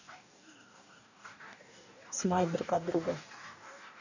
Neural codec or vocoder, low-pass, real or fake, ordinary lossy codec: codec, 44.1 kHz, 2.6 kbps, DAC; 7.2 kHz; fake; none